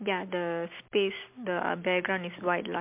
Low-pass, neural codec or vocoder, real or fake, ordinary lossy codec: 3.6 kHz; none; real; MP3, 32 kbps